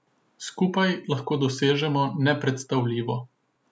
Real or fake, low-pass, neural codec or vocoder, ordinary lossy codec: real; none; none; none